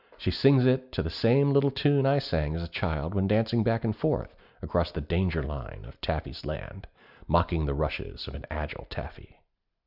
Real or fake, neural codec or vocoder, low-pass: real; none; 5.4 kHz